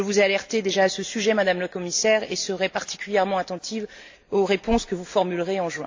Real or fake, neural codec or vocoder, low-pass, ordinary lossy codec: real; none; 7.2 kHz; AAC, 48 kbps